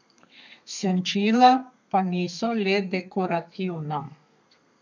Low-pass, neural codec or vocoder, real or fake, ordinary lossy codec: 7.2 kHz; codec, 32 kHz, 1.9 kbps, SNAC; fake; none